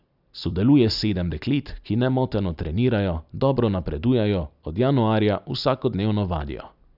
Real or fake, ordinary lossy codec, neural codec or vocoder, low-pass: real; none; none; 5.4 kHz